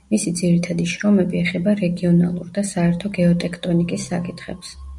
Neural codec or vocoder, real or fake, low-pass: none; real; 10.8 kHz